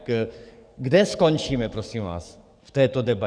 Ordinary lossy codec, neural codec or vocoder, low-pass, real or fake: Opus, 64 kbps; codec, 44.1 kHz, 7.8 kbps, DAC; 9.9 kHz; fake